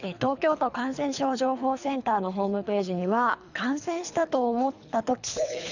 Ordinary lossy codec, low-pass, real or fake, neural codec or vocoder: none; 7.2 kHz; fake; codec, 24 kHz, 3 kbps, HILCodec